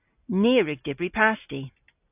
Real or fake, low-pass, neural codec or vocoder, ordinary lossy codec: real; 3.6 kHz; none; AAC, 32 kbps